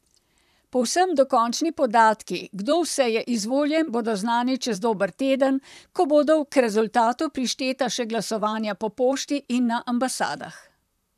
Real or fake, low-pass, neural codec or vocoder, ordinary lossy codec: fake; 14.4 kHz; vocoder, 44.1 kHz, 128 mel bands, Pupu-Vocoder; none